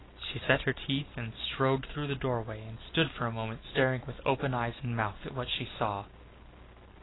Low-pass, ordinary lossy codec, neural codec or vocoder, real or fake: 7.2 kHz; AAC, 16 kbps; none; real